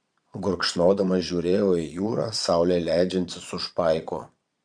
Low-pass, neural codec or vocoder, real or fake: 9.9 kHz; vocoder, 22.05 kHz, 80 mel bands, Vocos; fake